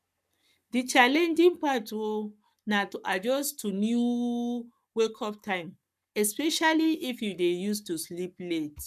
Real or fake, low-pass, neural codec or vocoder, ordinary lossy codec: fake; 14.4 kHz; codec, 44.1 kHz, 7.8 kbps, Pupu-Codec; none